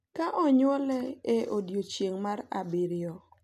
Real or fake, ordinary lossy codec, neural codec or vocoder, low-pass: real; none; none; 14.4 kHz